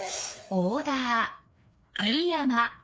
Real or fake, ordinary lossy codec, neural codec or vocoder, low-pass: fake; none; codec, 16 kHz, 2 kbps, FreqCodec, larger model; none